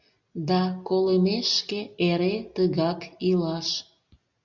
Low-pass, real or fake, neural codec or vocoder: 7.2 kHz; real; none